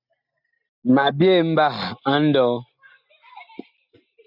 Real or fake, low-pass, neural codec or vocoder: real; 5.4 kHz; none